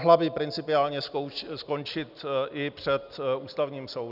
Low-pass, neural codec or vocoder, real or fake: 5.4 kHz; none; real